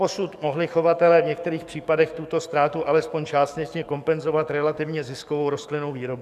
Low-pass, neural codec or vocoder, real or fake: 14.4 kHz; codec, 44.1 kHz, 7.8 kbps, DAC; fake